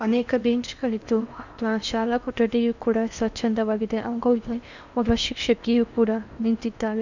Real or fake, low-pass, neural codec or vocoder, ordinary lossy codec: fake; 7.2 kHz; codec, 16 kHz in and 24 kHz out, 0.8 kbps, FocalCodec, streaming, 65536 codes; none